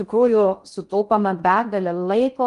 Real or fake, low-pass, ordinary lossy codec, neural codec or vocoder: fake; 10.8 kHz; Opus, 32 kbps; codec, 16 kHz in and 24 kHz out, 0.6 kbps, FocalCodec, streaming, 2048 codes